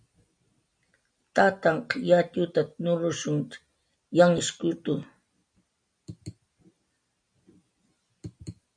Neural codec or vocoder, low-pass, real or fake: none; 9.9 kHz; real